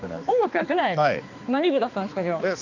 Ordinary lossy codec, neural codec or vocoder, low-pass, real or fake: none; codec, 16 kHz, 2 kbps, X-Codec, HuBERT features, trained on balanced general audio; 7.2 kHz; fake